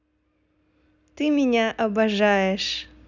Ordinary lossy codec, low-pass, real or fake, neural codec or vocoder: none; 7.2 kHz; real; none